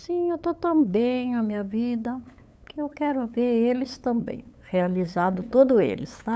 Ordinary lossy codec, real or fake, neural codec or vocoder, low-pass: none; fake; codec, 16 kHz, 8 kbps, FunCodec, trained on LibriTTS, 25 frames a second; none